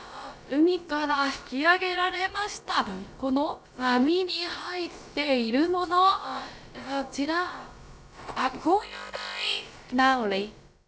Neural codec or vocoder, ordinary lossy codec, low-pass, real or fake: codec, 16 kHz, about 1 kbps, DyCAST, with the encoder's durations; none; none; fake